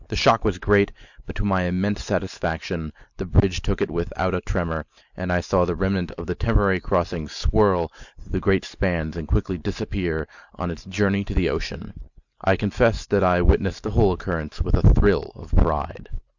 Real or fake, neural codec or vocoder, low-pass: real; none; 7.2 kHz